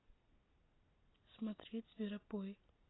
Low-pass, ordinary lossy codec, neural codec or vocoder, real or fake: 7.2 kHz; AAC, 16 kbps; none; real